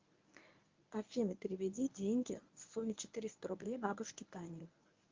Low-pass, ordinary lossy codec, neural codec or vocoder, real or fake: 7.2 kHz; Opus, 32 kbps; codec, 24 kHz, 0.9 kbps, WavTokenizer, medium speech release version 1; fake